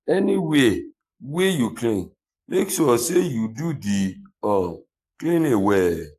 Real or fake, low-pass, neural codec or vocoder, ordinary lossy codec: fake; 14.4 kHz; codec, 44.1 kHz, 7.8 kbps, Pupu-Codec; AAC, 64 kbps